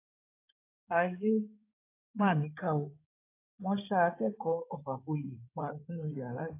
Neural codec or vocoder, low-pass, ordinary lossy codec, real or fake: codec, 16 kHz in and 24 kHz out, 2.2 kbps, FireRedTTS-2 codec; 3.6 kHz; AAC, 24 kbps; fake